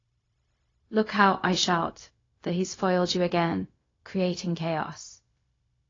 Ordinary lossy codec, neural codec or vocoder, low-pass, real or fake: AAC, 32 kbps; codec, 16 kHz, 0.4 kbps, LongCat-Audio-Codec; 7.2 kHz; fake